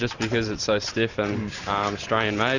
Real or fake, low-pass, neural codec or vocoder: fake; 7.2 kHz; vocoder, 44.1 kHz, 128 mel bands every 512 samples, BigVGAN v2